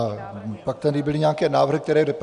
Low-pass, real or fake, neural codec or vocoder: 10.8 kHz; real; none